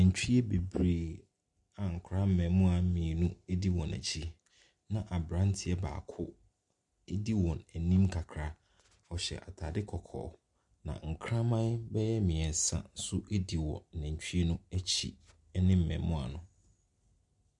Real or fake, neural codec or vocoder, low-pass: real; none; 10.8 kHz